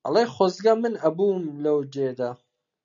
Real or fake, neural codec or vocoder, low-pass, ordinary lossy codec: real; none; 7.2 kHz; MP3, 96 kbps